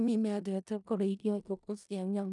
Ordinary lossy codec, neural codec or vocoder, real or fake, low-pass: none; codec, 16 kHz in and 24 kHz out, 0.4 kbps, LongCat-Audio-Codec, four codebook decoder; fake; 10.8 kHz